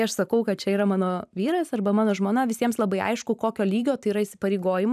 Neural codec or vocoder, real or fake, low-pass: vocoder, 44.1 kHz, 128 mel bands every 512 samples, BigVGAN v2; fake; 14.4 kHz